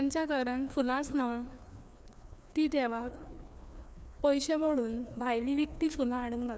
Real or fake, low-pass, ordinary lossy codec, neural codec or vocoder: fake; none; none; codec, 16 kHz, 2 kbps, FreqCodec, larger model